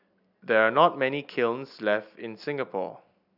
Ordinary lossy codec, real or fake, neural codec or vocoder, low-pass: none; real; none; 5.4 kHz